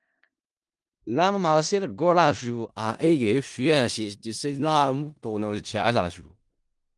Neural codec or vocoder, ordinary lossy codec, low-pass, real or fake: codec, 16 kHz in and 24 kHz out, 0.4 kbps, LongCat-Audio-Codec, four codebook decoder; Opus, 24 kbps; 10.8 kHz; fake